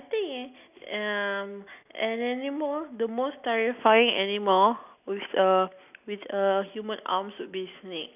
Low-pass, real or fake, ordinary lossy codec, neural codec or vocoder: 3.6 kHz; real; none; none